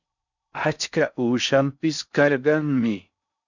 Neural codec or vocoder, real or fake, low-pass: codec, 16 kHz in and 24 kHz out, 0.6 kbps, FocalCodec, streaming, 4096 codes; fake; 7.2 kHz